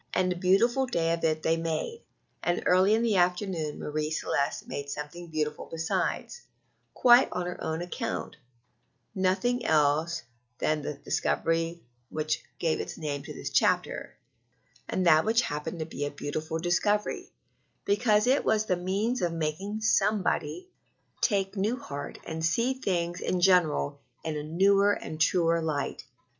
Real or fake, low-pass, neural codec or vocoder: real; 7.2 kHz; none